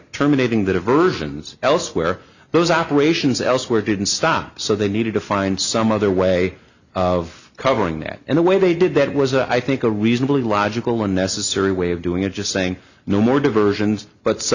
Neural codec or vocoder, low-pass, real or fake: none; 7.2 kHz; real